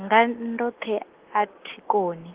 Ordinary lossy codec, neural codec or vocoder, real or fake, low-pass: Opus, 16 kbps; none; real; 3.6 kHz